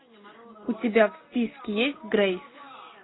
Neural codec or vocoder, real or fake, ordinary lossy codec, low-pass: none; real; AAC, 16 kbps; 7.2 kHz